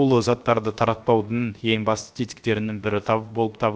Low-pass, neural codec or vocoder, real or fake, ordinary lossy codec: none; codec, 16 kHz, 0.7 kbps, FocalCodec; fake; none